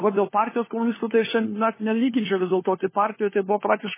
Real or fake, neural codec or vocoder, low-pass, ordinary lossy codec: fake; codec, 16 kHz, 4 kbps, FunCodec, trained on LibriTTS, 50 frames a second; 3.6 kHz; MP3, 16 kbps